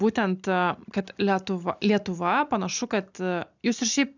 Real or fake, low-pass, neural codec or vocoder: real; 7.2 kHz; none